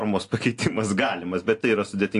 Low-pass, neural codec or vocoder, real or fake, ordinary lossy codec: 10.8 kHz; none; real; AAC, 48 kbps